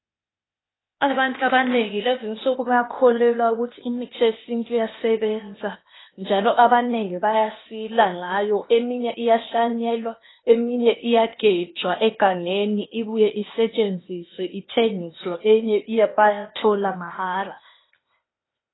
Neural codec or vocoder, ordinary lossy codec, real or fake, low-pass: codec, 16 kHz, 0.8 kbps, ZipCodec; AAC, 16 kbps; fake; 7.2 kHz